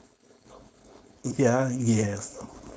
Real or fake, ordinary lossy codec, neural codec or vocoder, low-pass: fake; none; codec, 16 kHz, 4.8 kbps, FACodec; none